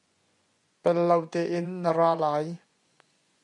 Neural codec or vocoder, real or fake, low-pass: vocoder, 24 kHz, 100 mel bands, Vocos; fake; 10.8 kHz